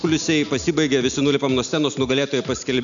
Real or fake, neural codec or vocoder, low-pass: real; none; 7.2 kHz